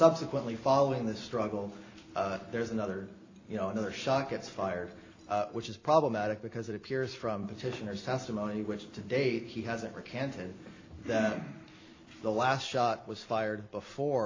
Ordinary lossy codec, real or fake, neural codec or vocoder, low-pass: AAC, 32 kbps; real; none; 7.2 kHz